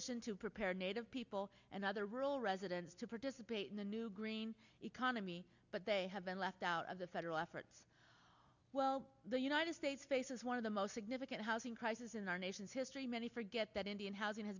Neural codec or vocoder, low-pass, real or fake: none; 7.2 kHz; real